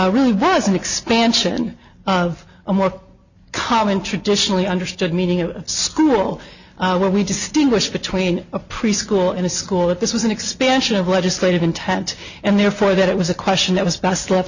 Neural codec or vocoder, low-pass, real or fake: none; 7.2 kHz; real